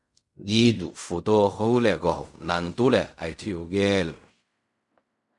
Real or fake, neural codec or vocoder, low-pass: fake; codec, 16 kHz in and 24 kHz out, 0.4 kbps, LongCat-Audio-Codec, fine tuned four codebook decoder; 10.8 kHz